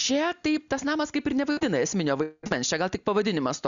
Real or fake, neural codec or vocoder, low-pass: real; none; 7.2 kHz